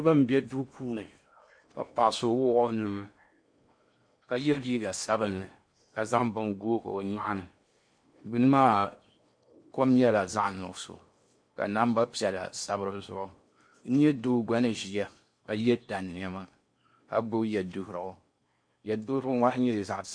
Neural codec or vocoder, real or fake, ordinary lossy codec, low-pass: codec, 16 kHz in and 24 kHz out, 0.8 kbps, FocalCodec, streaming, 65536 codes; fake; MP3, 48 kbps; 9.9 kHz